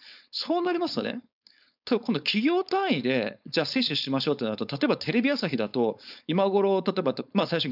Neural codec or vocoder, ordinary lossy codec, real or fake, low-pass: codec, 16 kHz, 4.8 kbps, FACodec; none; fake; 5.4 kHz